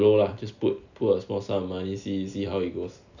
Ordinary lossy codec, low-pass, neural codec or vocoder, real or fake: none; 7.2 kHz; none; real